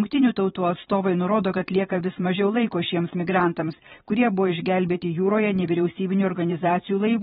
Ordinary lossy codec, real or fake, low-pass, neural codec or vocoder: AAC, 16 kbps; fake; 19.8 kHz; vocoder, 44.1 kHz, 128 mel bands every 512 samples, BigVGAN v2